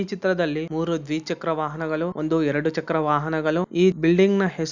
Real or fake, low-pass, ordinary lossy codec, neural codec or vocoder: real; 7.2 kHz; none; none